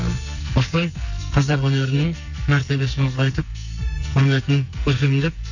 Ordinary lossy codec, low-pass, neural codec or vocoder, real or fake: none; 7.2 kHz; codec, 44.1 kHz, 2.6 kbps, SNAC; fake